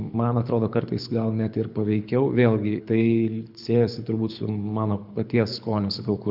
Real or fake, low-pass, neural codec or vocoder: fake; 5.4 kHz; codec, 24 kHz, 6 kbps, HILCodec